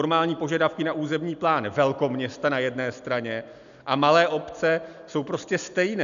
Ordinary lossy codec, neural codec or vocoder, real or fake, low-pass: MP3, 96 kbps; none; real; 7.2 kHz